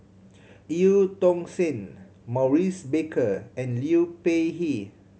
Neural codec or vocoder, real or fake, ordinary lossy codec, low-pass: none; real; none; none